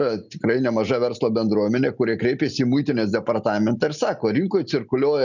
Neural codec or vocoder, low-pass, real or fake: none; 7.2 kHz; real